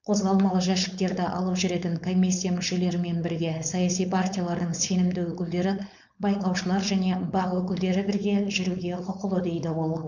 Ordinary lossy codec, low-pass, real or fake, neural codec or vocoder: none; 7.2 kHz; fake; codec, 16 kHz, 4.8 kbps, FACodec